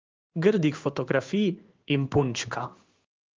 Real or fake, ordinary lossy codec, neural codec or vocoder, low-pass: fake; Opus, 24 kbps; codec, 16 kHz in and 24 kHz out, 1 kbps, XY-Tokenizer; 7.2 kHz